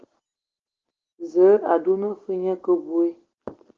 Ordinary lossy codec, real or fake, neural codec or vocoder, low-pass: Opus, 16 kbps; real; none; 7.2 kHz